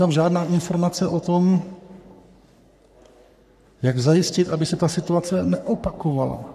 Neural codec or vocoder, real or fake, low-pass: codec, 44.1 kHz, 3.4 kbps, Pupu-Codec; fake; 14.4 kHz